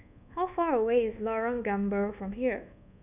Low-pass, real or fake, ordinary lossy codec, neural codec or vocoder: 3.6 kHz; fake; none; codec, 24 kHz, 1.2 kbps, DualCodec